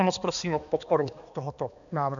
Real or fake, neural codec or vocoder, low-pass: fake; codec, 16 kHz, 2 kbps, X-Codec, HuBERT features, trained on balanced general audio; 7.2 kHz